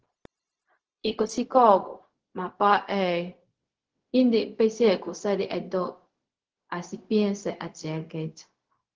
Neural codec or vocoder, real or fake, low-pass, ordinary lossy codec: codec, 16 kHz, 0.4 kbps, LongCat-Audio-Codec; fake; 7.2 kHz; Opus, 16 kbps